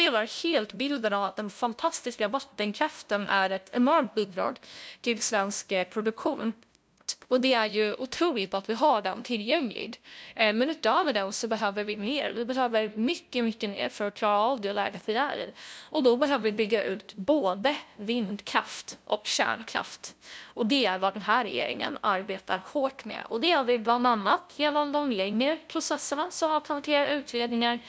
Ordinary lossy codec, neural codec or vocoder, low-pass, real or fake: none; codec, 16 kHz, 0.5 kbps, FunCodec, trained on LibriTTS, 25 frames a second; none; fake